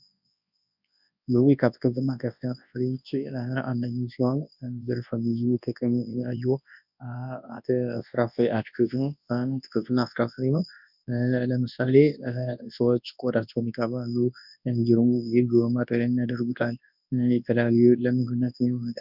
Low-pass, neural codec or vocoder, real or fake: 5.4 kHz; codec, 24 kHz, 0.9 kbps, WavTokenizer, large speech release; fake